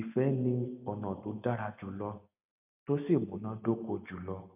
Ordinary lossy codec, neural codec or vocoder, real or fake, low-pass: none; none; real; 3.6 kHz